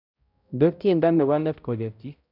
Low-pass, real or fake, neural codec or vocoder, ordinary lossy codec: 5.4 kHz; fake; codec, 16 kHz, 0.5 kbps, X-Codec, HuBERT features, trained on balanced general audio; none